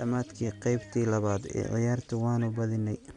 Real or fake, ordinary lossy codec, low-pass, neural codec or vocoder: real; Opus, 64 kbps; 10.8 kHz; none